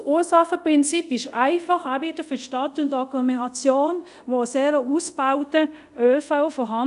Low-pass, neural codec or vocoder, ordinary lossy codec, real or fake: 10.8 kHz; codec, 24 kHz, 0.5 kbps, DualCodec; none; fake